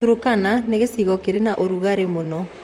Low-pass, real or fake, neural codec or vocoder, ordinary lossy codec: 19.8 kHz; fake; vocoder, 44.1 kHz, 128 mel bands, Pupu-Vocoder; MP3, 64 kbps